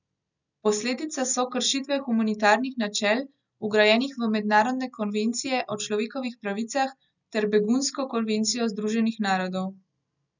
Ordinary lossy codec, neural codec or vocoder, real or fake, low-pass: none; none; real; 7.2 kHz